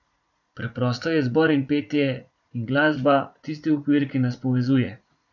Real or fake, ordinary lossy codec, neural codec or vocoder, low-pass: fake; none; vocoder, 44.1 kHz, 80 mel bands, Vocos; 7.2 kHz